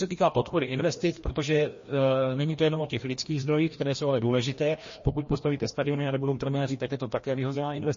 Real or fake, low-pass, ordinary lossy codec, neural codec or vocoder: fake; 7.2 kHz; MP3, 32 kbps; codec, 16 kHz, 1 kbps, FreqCodec, larger model